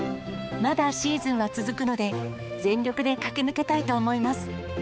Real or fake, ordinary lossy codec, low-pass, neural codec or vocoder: fake; none; none; codec, 16 kHz, 4 kbps, X-Codec, HuBERT features, trained on balanced general audio